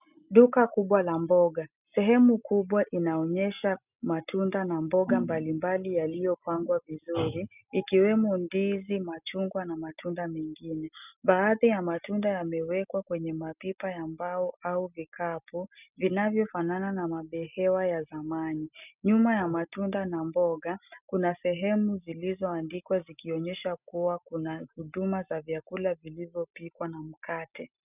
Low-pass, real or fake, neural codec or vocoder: 3.6 kHz; real; none